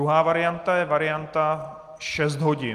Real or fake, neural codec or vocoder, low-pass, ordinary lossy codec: real; none; 14.4 kHz; Opus, 32 kbps